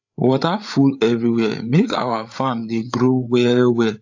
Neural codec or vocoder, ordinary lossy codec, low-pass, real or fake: codec, 16 kHz, 8 kbps, FreqCodec, larger model; AAC, 48 kbps; 7.2 kHz; fake